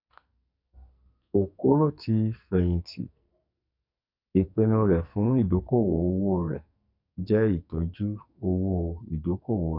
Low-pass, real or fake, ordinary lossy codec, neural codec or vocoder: 5.4 kHz; fake; none; codec, 44.1 kHz, 2.6 kbps, SNAC